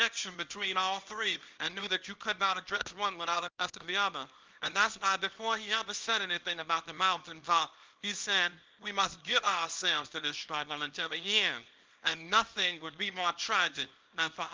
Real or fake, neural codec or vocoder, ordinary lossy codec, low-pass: fake; codec, 24 kHz, 0.9 kbps, WavTokenizer, small release; Opus, 32 kbps; 7.2 kHz